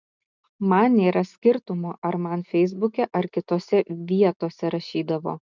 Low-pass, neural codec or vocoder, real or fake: 7.2 kHz; none; real